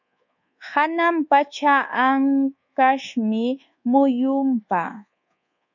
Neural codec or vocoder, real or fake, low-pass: codec, 24 kHz, 1.2 kbps, DualCodec; fake; 7.2 kHz